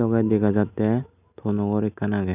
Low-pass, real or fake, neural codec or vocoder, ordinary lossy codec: 3.6 kHz; real; none; none